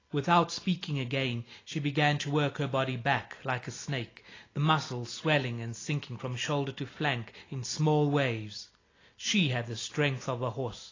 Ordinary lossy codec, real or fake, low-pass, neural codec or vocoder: AAC, 32 kbps; real; 7.2 kHz; none